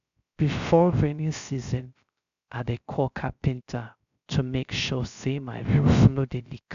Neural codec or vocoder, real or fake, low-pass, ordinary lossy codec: codec, 16 kHz, 0.3 kbps, FocalCodec; fake; 7.2 kHz; none